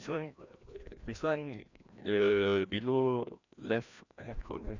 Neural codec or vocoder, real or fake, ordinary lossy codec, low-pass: codec, 16 kHz, 1 kbps, FreqCodec, larger model; fake; none; 7.2 kHz